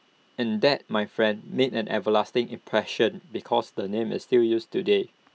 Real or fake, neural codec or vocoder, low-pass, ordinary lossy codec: real; none; none; none